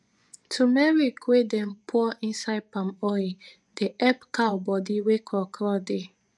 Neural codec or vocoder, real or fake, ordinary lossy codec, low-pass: vocoder, 24 kHz, 100 mel bands, Vocos; fake; none; none